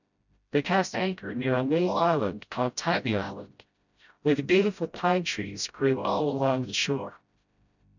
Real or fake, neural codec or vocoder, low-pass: fake; codec, 16 kHz, 0.5 kbps, FreqCodec, smaller model; 7.2 kHz